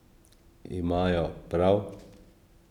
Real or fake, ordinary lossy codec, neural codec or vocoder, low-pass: real; none; none; 19.8 kHz